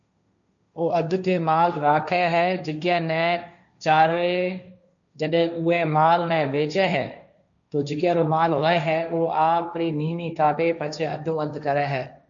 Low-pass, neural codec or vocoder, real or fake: 7.2 kHz; codec, 16 kHz, 1.1 kbps, Voila-Tokenizer; fake